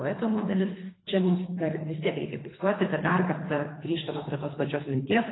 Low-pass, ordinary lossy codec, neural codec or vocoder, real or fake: 7.2 kHz; AAC, 16 kbps; codec, 24 kHz, 3 kbps, HILCodec; fake